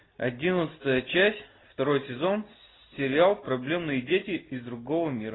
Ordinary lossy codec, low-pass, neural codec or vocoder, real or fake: AAC, 16 kbps; 7.2 kHz; none; real